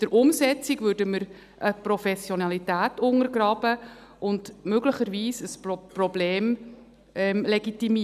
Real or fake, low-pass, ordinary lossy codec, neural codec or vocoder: real; 14.4 kHz; none; none